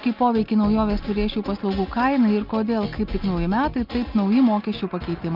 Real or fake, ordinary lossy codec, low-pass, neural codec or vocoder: real; Opus, 24 kbps; 5.4 kHz; none